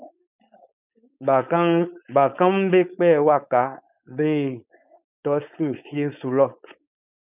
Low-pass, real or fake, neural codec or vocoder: 3.6 kHz; fake; codec, 16 kHz, 4.8 kbps, FACodec